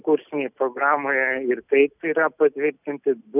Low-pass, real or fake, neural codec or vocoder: 3.6 kHz; real; none